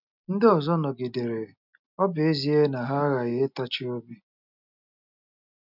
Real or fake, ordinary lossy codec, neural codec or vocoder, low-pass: real; none; none; 5.4 kHz